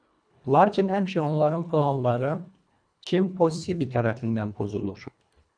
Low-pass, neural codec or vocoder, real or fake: 9.9 kHz; codec, 24 kHz, 1.5 kbps, HILCodec; fake